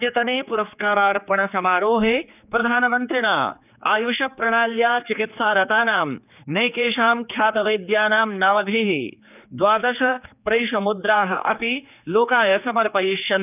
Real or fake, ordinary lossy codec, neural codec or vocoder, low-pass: fake; none; codec, 16 kHz, 4 kbps, X-Codec, HuBERT features, trained on general audio; 3.6 kHz